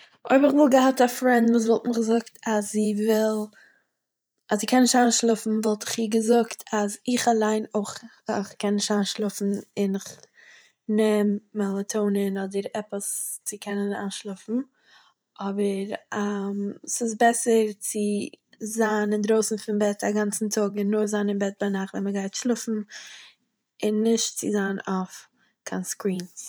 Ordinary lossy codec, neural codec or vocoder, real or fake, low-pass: none; vocoder, 44.1 kHz, 128 mel bands every 512 samples, BigVGAN v2; fake; none